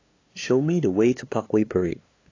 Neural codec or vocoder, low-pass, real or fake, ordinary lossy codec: codec, 16 kHz, 2 kbps, FunCodec, trained on LibriTTS, 25 frames a second; 7.2 kHz; fake; AAC, 32 kbps